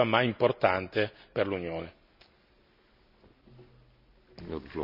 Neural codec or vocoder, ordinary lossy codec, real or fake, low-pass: none; none; real; 5.4 kHz